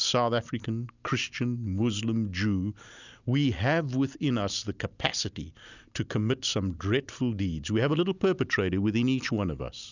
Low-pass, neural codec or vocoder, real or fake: 7.2 kHz; none; real